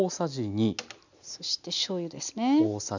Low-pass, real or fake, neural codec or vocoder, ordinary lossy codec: 7.2 kHz; real; none; none